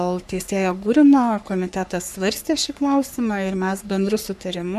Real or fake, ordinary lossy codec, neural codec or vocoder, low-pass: fake; MP3, 96 kbps; codec, 44.1 kHz, 3.4 kbps, Pupu-Codec; 14.4 kHz